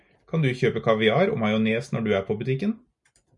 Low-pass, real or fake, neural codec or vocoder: 10.8 kHz; real; none